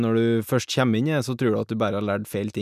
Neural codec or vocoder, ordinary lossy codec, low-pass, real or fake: none; none; 14.4 kHz; real